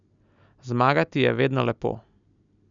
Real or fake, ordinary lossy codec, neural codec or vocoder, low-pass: real; none; none; 7.2 kHz